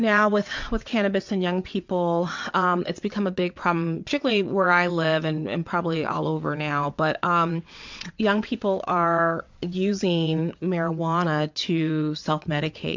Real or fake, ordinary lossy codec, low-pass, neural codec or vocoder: fake; MP3, 64 kbps; 7.2 kHz; vocoder, 22.05 kHz, 80 mel bands, WaveNeXt